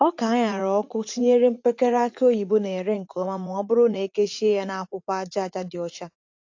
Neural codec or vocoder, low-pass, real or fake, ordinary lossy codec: vocoder, 44.1 kHz, 80 mel bands, Vocos; 7.2 kHz; fake; AAC, 48 kbps